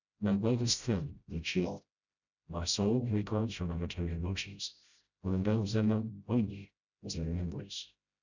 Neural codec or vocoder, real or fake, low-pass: codec, 16 kHz, 0.5 kbps, FreqCodec, smaller model; fake; 7.2 kHz